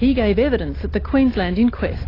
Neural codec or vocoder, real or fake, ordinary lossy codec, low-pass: none; real; AAC, 24 kbps; 5.4 kHz